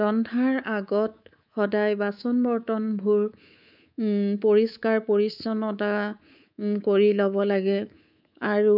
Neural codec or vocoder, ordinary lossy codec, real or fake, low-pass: codec, 24 kHz, 3.1 kbps, DualCodec; none; fake; 5.4 kHz